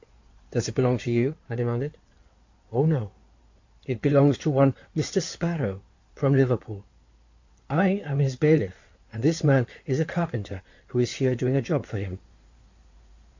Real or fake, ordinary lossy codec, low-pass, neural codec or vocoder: fake; AAC, 48 kbps; 7.2 kHz; codec, 16 kHz in and 24 kHz out, 2.2 kbps, FireRedTTS-2 codec